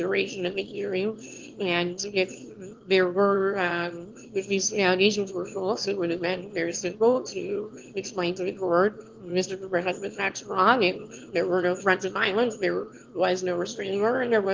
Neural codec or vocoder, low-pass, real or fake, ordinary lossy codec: autoencoder, 22.05 kHz, a latent of 192 numbers a frame, VITS, trained on one speaker; 7.2 kHz; fake; Opus, 16 kbps